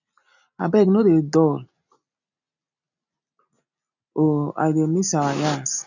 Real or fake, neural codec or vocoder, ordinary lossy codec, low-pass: real; none; none; 7.2 kHz